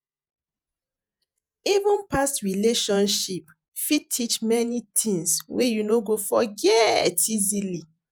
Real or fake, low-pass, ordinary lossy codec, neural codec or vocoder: fake; none; none; vocoder, 48 kHz, 128 mel bands, Vocos